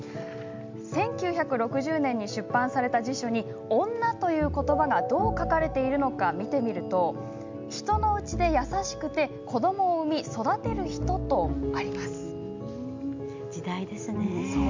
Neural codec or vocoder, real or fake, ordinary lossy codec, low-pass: none; real; MP3, 64 kbps; 7.2 kHz